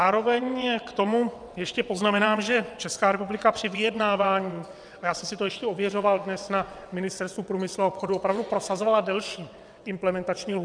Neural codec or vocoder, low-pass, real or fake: vocoder, 22.05 kHz, 80 mel bands, WaveNeXt; 9.9 kHz; fake